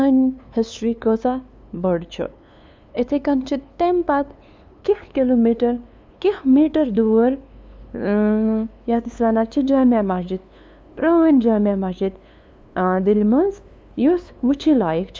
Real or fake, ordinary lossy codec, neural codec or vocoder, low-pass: fake; none; codec, 16 kHz, 2 kbps, FunCodec, trained on LibriTTS, 25 frames a second; none